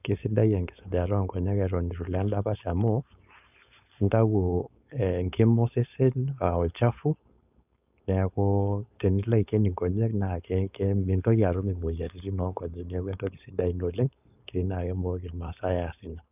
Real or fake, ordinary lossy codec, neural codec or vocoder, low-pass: fake; none; codec, 16 kHz, 4.8 kbps, FACodec; 3.6 kHz